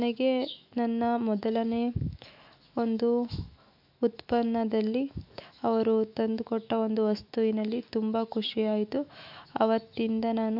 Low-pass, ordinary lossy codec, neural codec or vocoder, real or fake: 5.4 kHz; MP3, 48 kbps; autoencoder, 48 kHz, 128 numbers a frame, DAC-VAE, trained on Japanese speech; fake